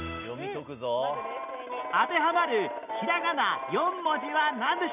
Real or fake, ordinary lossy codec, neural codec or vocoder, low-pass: real; none; none; 3.6 kHz